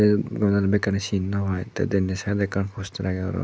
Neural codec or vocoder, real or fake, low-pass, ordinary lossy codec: none; real; none; none